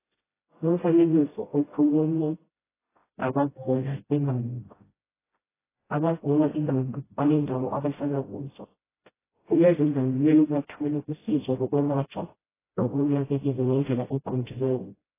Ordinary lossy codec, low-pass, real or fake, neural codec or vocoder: AAC, 16 kbps; 3.6 kHz; fake; codec, 16 kHz, 0.5 kbps, FreqCodec, smaller model